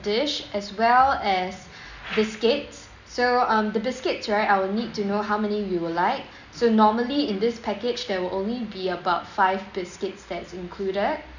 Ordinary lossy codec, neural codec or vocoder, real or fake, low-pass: none; none; real; 7.2 kHz